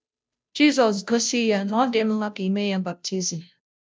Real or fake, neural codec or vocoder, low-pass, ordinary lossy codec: fake; codec, 16 kHz, 0.5 kbps, FunCodec, trained on Chinese and English, 25 frames a second; none; none